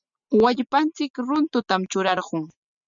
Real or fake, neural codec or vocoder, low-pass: real; none; 7.2 kHz